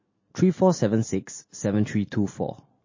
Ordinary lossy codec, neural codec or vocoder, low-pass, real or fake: MP3, 32 kbps; none; 7.2 kHz; real